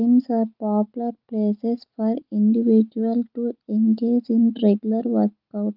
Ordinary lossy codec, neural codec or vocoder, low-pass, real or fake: Opus, 24 kbps; none; 5.4 kHz; real